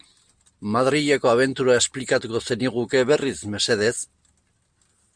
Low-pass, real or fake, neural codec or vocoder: 9.9 kHz; real; none